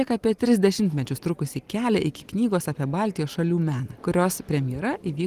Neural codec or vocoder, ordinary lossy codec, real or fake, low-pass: none; Opus, 24 kbps; real; 14.4 kHz